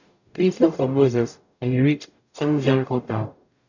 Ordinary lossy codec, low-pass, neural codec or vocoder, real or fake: none; 7.2 kHz; codec, 44.1 kHz, 0.9 kbps, DAC; fake